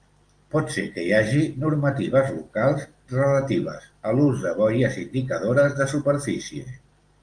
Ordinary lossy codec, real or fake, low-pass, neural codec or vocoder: Opus, 32 kbps; real; 9.9 kHz; none